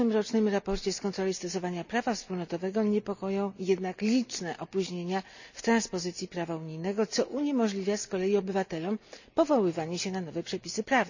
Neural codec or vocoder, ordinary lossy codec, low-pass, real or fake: none; none; 7.2 kHz; real